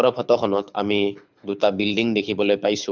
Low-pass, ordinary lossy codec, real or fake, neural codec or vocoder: 7.2 kHz; none; fake; codec, 24 kHz, 6 kbps, HILCodec